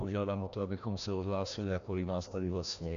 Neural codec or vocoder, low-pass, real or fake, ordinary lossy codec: codec, 16 kHz, 1 kbps, FreqCodec, larger model; 7.2 kHz; fake; AAC, 64 kbps